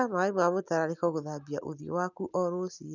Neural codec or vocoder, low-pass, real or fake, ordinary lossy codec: none; 7.2 kHz; real; none